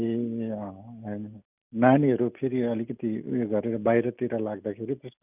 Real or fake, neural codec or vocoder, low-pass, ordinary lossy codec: real; none; 3.6 kHz; none